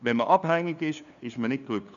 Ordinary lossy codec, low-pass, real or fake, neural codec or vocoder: none; 7.2 kHz; fake; codec, 16 kHz, 2 kbps, FunCodec, trained on LibriTTS, 25 frames a second